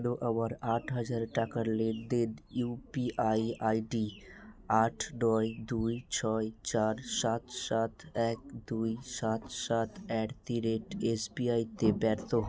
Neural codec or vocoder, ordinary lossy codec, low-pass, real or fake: none; none; none; real